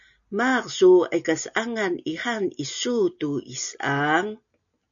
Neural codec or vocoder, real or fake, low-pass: none; real; 7.2 kHz